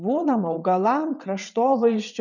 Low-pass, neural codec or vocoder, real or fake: 7.2 kHz; vocoder, 22.05 kHz, 80 mel bands, WaveNeXt; fake